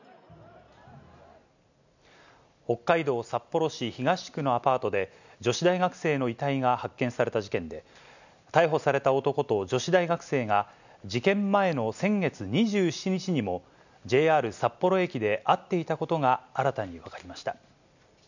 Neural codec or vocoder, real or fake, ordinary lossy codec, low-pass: none; real; none; 7.2 kHz